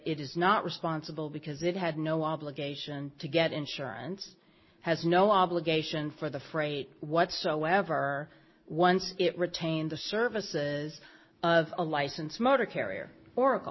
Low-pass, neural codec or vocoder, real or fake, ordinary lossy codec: 7.2 kHz; none; real; MP3, 24 kbps